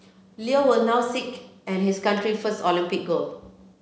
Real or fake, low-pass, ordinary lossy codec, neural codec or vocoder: real; none; none; none